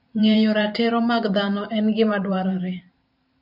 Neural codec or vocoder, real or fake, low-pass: vocoder, 44.1 kHz, 128 mel bands every 512 samples, BigVGAN v2; fake; 5.4 kHz